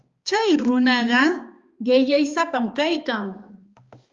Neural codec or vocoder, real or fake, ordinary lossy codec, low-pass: codec, 16 kHz, 2 kbps, X-Codec, HuBERT features, trained on balanced general audio; fake; Opus, 32 kbps; 7.2 kHz